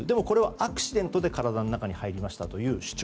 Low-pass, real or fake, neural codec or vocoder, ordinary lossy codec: none; real; none; none